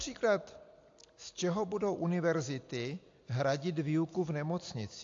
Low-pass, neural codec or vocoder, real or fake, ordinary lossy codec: 7.2 kHz; none; real; AAC, 48 kbps